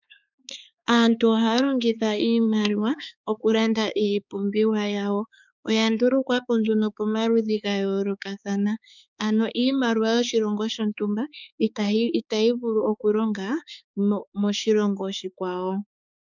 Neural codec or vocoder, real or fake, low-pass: codec, 16 kHz, 4 kbps, X-Codec, HuBERT features, trained on balanced general audio; fake; 7.2 kHz